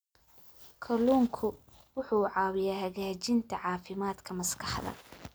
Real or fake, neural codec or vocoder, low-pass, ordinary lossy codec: real; none; none; none